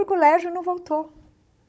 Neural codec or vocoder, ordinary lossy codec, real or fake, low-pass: codec, 16 kHz, 16 kbps, FunCodec, trained on Chinese and English, 50 frames a second; none; fake; none